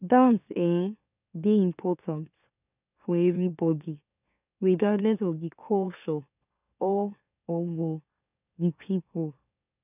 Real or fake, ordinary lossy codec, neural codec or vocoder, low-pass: fake; none; autoencoder, 44.1 kHz, a latent of 192 numbers a frame, MeloTTS; 3.6 kHz